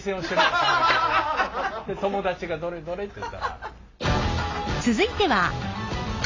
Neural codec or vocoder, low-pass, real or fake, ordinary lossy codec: none; 7.2 kHz; real; AAC, 32 kbps